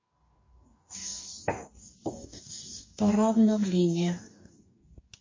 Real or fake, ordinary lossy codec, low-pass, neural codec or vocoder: fake; MP3, 32 kbps; 7.2 kHz; codec, 44.1 kHz, 2.6 kbps, DAC